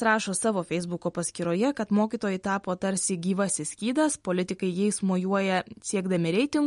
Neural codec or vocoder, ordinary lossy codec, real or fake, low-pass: none; MP3, 48 kbps; real; 19.8 kHz